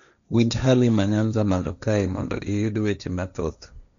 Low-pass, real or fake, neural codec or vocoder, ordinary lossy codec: 7.2 kHz; fake; codec, 16 kHz, 1.1 kbps, Voila-Tokenizer; none